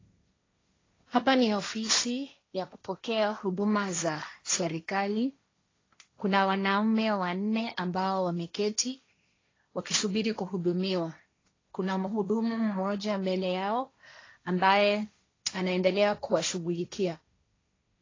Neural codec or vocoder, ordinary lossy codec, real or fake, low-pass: codec, 16 kHz, 1.1 kbps, Voila-Tokenizer; AAC, 32 kbps; fake; 7.2 kHz